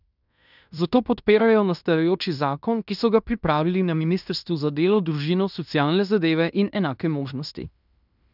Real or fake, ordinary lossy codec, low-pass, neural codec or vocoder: fake; none; 5.4 kHz; codec, 16 kHz in and 24 kHz out, 0.9 kbps, LongCat-Audio-Codec, fine tuned four codebook decoder